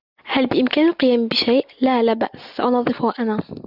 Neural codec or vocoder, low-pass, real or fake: none; 5.4 kHz; real